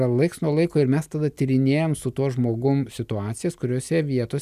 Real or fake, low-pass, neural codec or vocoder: real; 14.4 kHz; none